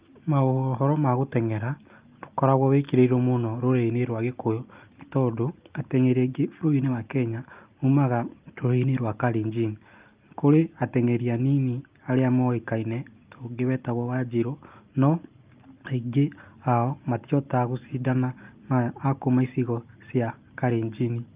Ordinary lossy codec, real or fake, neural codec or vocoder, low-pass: Opus, 32 kbps; real; none; 3.6 kHz